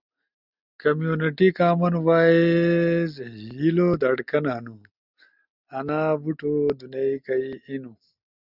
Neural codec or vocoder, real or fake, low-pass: none; real; 5.4 kHz